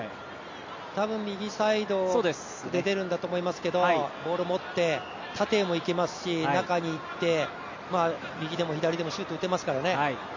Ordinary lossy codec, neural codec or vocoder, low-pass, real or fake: MP3, 48 kbps; none; 7.2 kHz; real